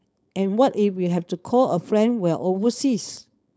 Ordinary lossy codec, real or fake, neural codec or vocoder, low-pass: none; fake; codec, 16 kHz, 4.8 kbps, FACodec; none